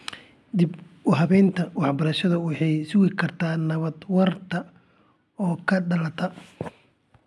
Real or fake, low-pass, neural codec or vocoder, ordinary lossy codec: real; none; none; none